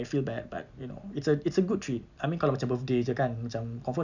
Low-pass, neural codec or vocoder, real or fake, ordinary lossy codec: 7.2 kHz; none; real; none